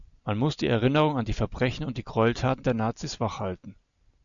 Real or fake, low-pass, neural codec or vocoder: real; 7.2 kHz; none